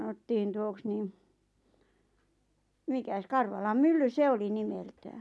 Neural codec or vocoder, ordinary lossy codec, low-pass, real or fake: none; none; none; real